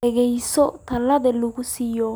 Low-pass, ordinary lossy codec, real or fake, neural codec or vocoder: none; none; real; none